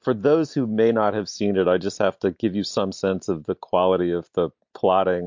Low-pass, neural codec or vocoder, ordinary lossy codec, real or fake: 7.2 kHz; none; MP3, 48 kbps; real